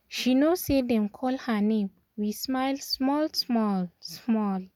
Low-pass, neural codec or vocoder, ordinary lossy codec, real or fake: 19.8 kHz; none; none; real